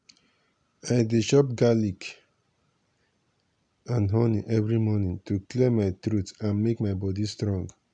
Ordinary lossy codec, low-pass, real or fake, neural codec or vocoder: none; 9.9 kHz; real; none